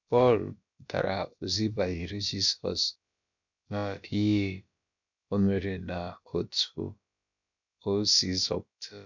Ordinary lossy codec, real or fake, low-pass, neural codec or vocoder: none; fake; 7.2 kHz; codec, 16 kHz, about 1 kbps, DyCAST, with the encoder's durations